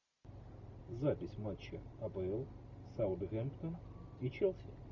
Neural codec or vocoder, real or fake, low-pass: none; real; 7.2 kHz